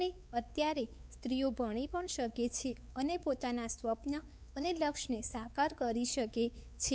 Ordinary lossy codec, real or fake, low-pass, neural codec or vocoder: none; fake; none; codec, 16 kHz, 4 kbps, X-Codec, WavLM features, trained on Multilingual LibriSpeech